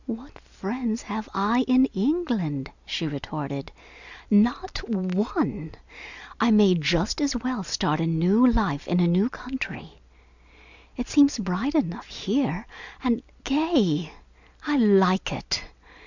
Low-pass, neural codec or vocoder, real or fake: 7.2 kHz; none; real